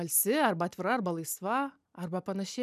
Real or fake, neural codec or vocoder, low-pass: real; none; 14.4 kHz